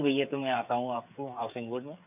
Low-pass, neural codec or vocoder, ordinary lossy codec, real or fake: 3.6 kHz; codec, 16 kHz, 8 kbps, FreqCodec, smaller model; none; fake